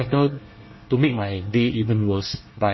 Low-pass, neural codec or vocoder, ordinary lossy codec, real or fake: 7.2 kHz; codec, 24 kHz, 1 kbps, SNAC; MP3, 24 kbps; fake